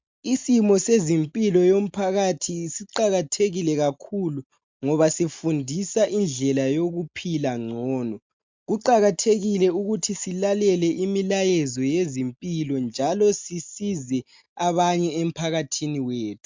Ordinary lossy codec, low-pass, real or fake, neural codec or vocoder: MP3, 64 kbps; 7.2 kHz; real; none